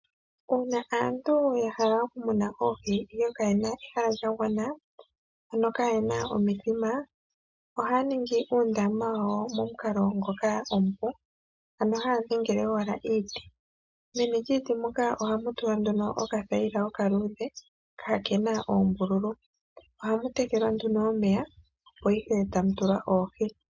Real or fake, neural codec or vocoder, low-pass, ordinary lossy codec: real; none; 7.2 kHz; MP3, 64 kbps